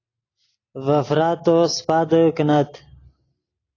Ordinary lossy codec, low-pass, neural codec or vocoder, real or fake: AAC, 32 kbps; 7.2 kHz; none; real